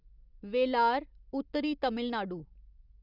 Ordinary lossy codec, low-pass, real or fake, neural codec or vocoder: Opus, 64 kbps; 5.4 kHz; real; none